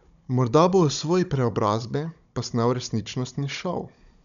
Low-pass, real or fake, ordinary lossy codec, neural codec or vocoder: 7.2 kHz; fake; none; codec, 16 kHz, 16 kbps, FunCodec, trained on Chinese and English, 50 frames a second